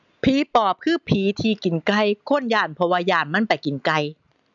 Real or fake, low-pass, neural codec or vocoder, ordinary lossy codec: real; 7.2 kHz; none; none